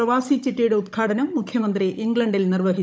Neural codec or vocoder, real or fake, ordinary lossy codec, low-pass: codec, 16 kHz, 16 kbps, FunCodec, trained on Chinese and English, 50 frames a second; fake; none; none